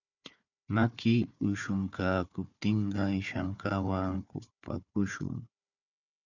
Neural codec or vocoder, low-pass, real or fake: codec, 16 kHz, 4 kbps, FunCodec, trained on Chinese and English, 50 frames a second; 7.2 kHz; fake